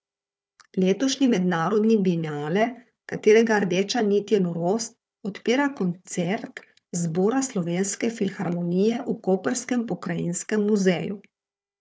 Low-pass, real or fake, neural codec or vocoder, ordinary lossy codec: none; fake; codec, 16 kHz, 4 kbps, FunCodec, trained on Chinese and English, 50 frames a second; none